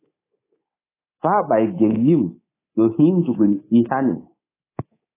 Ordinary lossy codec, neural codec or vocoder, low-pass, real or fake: AAC, 16 kbps; codec, 24 kHz, 3.1 kbps, DualCodec; 3.6 kHz; fake